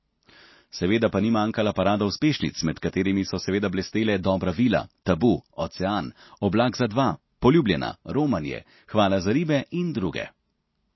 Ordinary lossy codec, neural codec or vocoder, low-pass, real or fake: MP3, 24 kbps; none; 7.2 kHz; real